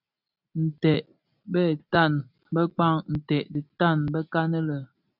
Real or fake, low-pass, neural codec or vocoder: real; 5.4 kHz; none